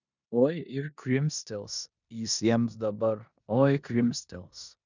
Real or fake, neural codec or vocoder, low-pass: fake; codec, 16 kHz in and 24 kHz out, 0.9 kbps, LongCat-Audio-Codec, four codebook decoder; 7.2 kHz